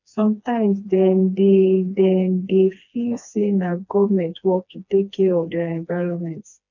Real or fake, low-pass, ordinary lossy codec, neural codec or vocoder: fake; 7.2 kHz; none; codec, 16 kHz, 2 kbps, FreqCodec, smaller model